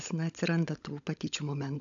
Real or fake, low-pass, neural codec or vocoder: fake; 7.2 kHz; codec, 16 kHz, 16 kbps, FunCodec, trained on LibriTTS, 50 frames a second